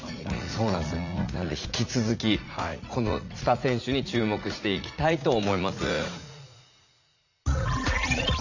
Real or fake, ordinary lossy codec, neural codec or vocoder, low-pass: fake; none; vocoder, 44.1 kHz, 80 mel bands, Vocos; 7.2 kHz